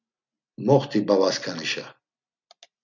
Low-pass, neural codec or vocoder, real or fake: 7.2 kHz; none; real